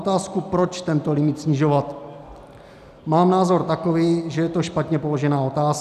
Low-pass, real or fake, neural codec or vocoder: 14.4 kHz; real; none